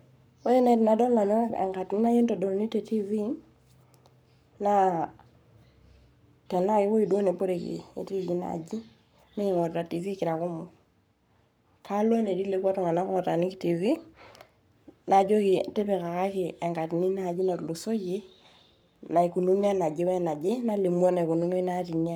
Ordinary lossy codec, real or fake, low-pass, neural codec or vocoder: none; fake; none; codec, 44.1 kHz, 7.8 kbps, Pupu-Codec